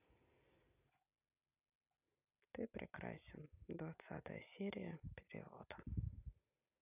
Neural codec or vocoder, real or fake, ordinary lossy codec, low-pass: none; real; none; 3.6 kHz